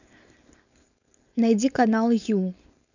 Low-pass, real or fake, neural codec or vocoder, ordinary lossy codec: 7.2 kHz; fake; codec, 16 kHz, 4.8 kbps, FACodec; none